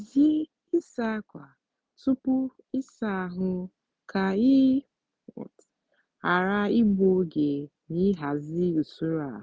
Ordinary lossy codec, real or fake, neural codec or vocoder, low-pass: none; real; none; none